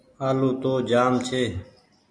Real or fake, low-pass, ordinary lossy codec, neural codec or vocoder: real; 9.9 kHz; MP3, 64 kbps; none